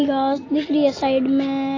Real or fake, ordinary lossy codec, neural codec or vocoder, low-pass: real; AAC, 32 kbps; none; 7.2 kHz